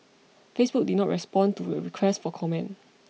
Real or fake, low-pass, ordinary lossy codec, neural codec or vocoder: real; none; none; none